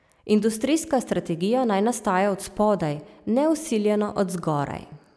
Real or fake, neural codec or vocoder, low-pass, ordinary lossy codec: real; none; none; none